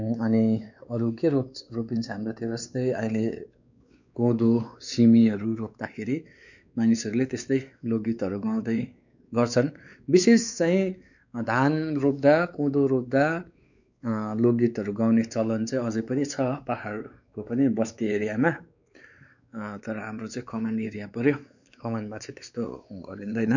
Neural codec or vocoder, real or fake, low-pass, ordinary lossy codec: codec, 16 kHz, 4 kbps, X-Codec, WavLM features, trained on Multilingual LibriSpeech; fake; 7.2 kHz; none